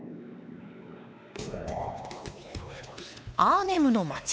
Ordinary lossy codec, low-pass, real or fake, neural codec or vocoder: none; none; fake; codec, 16 kHz, 2 kbps, X-Codec, WavLM features, trained on Multilingual LibriSpeech